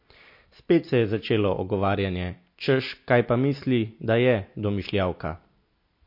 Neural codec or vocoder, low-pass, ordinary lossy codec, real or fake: none; 5.4 kHz; MP3, 32 kbps; real